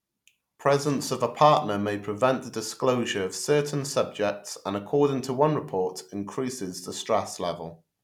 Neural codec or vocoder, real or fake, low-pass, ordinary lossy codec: none; real; 19.8 kHz; none